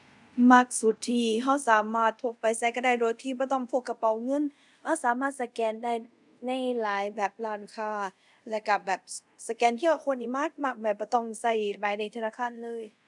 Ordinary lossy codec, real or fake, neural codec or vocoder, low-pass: none; fake; codec, 24 kHz, 0.5 kbps, DualCodec; 10.8 kHz